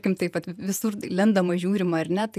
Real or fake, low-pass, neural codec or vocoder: fake; 14.4 kHz; vocoder, 48 kHz, 128 mel bands, Vocos